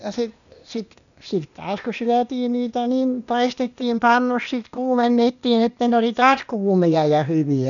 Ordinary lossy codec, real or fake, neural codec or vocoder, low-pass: none; fake; codec, 16 kHz, 0.8 kbps, ZipCodec; 7.2 kHz